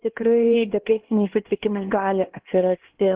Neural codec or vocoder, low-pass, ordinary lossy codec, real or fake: codec, 16 kHz, 1 kbps, X-Codec, HuBERT features, trained on LibriSpeech; 3.6 kHz; Opus, 16 kbps; fake